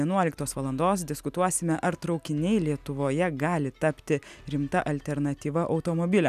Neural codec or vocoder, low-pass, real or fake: none; 14.4 kHz; real